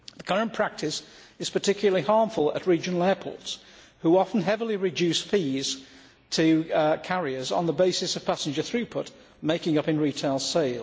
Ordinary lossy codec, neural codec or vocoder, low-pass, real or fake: none; none; none; real